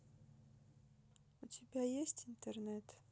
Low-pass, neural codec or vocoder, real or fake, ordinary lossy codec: none; none; real; none